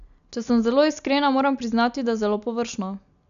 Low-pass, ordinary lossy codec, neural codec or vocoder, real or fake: 7.2 kHz; none; none; real